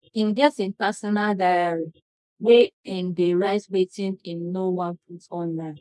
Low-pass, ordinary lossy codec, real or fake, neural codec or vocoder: none; none; fake; codec, 24 kHz, 0.9 kbps, WavTokenizer, medium music audio release